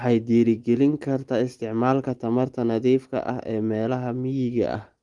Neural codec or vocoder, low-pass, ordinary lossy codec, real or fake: none; 10.8 kHz; Opus, 24 kbps; real